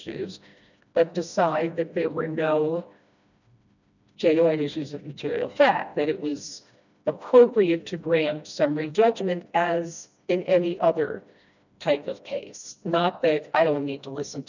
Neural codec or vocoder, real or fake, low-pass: codec, 16 kHz, 1 kbps, FreqCodec, smaller model; fake; 7.2 kHz